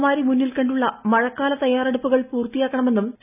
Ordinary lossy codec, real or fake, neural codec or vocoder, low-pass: none; real; none; 3.6 kHz